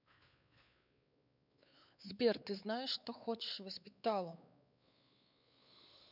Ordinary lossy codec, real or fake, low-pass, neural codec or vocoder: none; fake; 5.4 kHz; codec, 16 kHz, 4 kbps, X-Codec, WavLM features, trained on Multilingual LibriSpeech